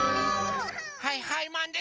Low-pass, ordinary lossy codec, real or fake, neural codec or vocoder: 7.2 kHz; Opus, 24 kbps; real; none